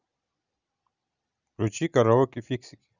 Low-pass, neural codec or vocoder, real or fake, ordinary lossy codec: 7.2 kHz; none; real; none